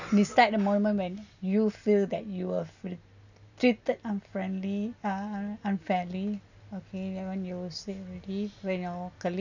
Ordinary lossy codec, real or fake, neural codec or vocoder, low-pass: none; real; none; 7.2 kHz